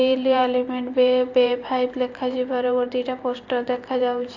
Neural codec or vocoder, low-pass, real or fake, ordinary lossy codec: vocoder, 44.1 kHz, 128 mel bands every 512 samples, BigVGAN v2; 7.2 kHz; fake; AAC, 48 kbps